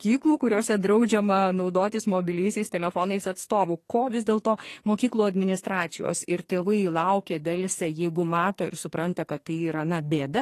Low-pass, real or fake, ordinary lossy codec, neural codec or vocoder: 14.4 kHz; fake; AAC, 48 kbps; codec, 44.1 kHz, 2.6 kbps, SNAC